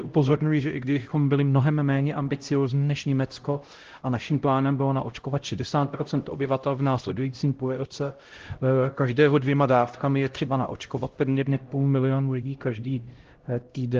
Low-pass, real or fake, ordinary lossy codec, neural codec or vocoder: 7.2 kHz; fake; Opus, 16 kbps; codec, 16 kHz, 0.5 kbps, X-Codec, HuBERT features, trained on LibriSpeech